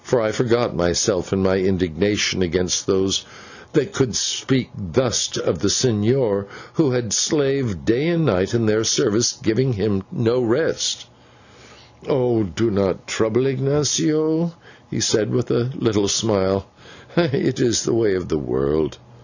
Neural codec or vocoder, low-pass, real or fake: none; 7.2 kHz; real